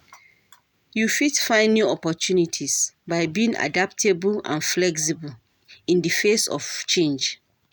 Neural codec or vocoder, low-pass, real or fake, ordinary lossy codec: none; none; real; none